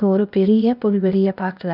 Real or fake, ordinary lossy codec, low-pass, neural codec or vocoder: fake; none; 5.4 kHz; codec, 16 kHz, 0.8 kbps, ZipCodec